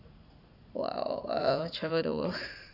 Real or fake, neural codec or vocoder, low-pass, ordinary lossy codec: fake; vocoder, 44.1 kHz, 80 mel bands, Vocos; 5.4 kHz; none